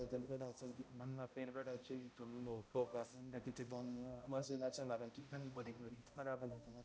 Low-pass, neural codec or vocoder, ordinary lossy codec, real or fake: none; codec, 16 kHz, 0.5 kbps, X-Codec, HuBERT features, trained on balanced general audio; none; fake